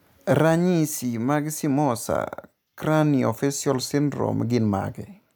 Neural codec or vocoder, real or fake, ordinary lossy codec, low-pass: none; real; none; none